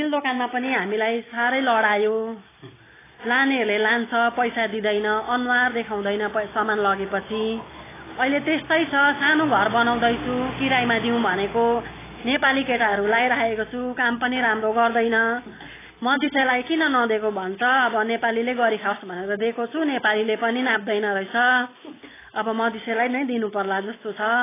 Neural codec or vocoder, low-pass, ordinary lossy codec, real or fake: none; 3.6 kHz; AAC, 16 kbps; real